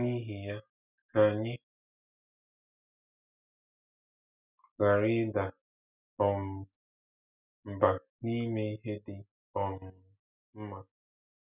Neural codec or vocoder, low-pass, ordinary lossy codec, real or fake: none; 3.6 kHz; none; real